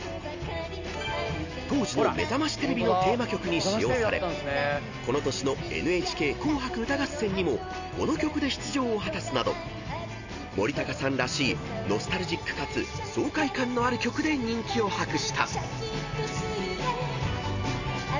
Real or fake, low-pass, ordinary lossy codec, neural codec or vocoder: real; 7.2 kHz; Opus, 64 kbps; none